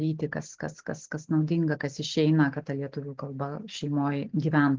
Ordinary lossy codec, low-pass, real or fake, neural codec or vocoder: Opus, 16 kbps; 7.2 kHz; real; none